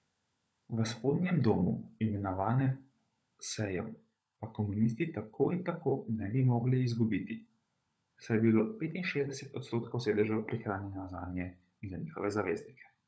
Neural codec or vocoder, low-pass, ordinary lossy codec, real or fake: codec, 16 kHz, 16 kbps, FunCodec, trained on LibriTTS, 50 frames a second; none; none; fake